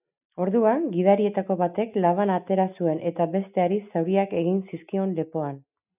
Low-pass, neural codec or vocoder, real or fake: 3.6 kHz; none; real